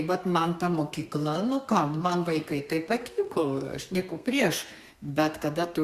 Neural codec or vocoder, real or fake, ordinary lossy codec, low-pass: codec, 32 kHz, 1.9 kbps, SNAC; fake; Opus, 64 kbps; 14.4 kHz